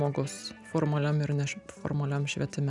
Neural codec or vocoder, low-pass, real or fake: none; 10.8 kHz; real